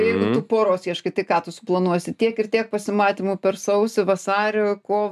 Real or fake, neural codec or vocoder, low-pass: real; none; 14.4 kHz